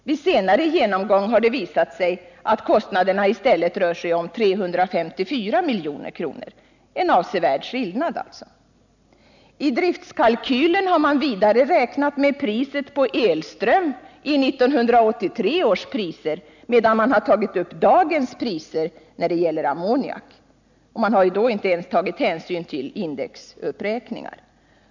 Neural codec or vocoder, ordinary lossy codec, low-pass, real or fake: none; none; 7.2 kHz; real